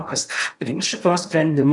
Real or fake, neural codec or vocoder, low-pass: fake; codec, 16 kHz in and 24 kHz out, 0.8 kbps, FocalCodec, streaming, 65536 codes; 10.8 kHz